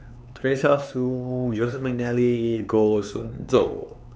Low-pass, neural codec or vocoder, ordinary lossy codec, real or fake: none; codec, 16 kHz, 4 kbps, X-Codec, HuBERT features, trained on LibriSpeech; none; fake